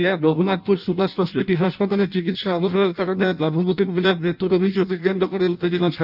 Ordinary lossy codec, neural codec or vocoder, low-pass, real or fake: none; codec, 16 kHz in and 24 kHz out, 0.6 kbps, FireRedTTS-2 codec; 5.4 kHz; fake